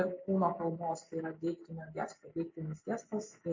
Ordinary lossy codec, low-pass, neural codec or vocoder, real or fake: MP3, 48 kbps; 7.2 kHz; none; real